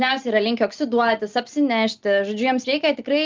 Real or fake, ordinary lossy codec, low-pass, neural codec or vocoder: real; Opus, 24 kbps; 7.2 kHz; none